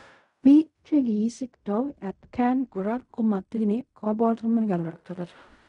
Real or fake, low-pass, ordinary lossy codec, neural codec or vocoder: fake; 10.8 kHz; none; codec, 16 kHz in and 24 kHz out, 0.4 kbps, LongCat-Audio-Codec, fine tuned four codebook decoder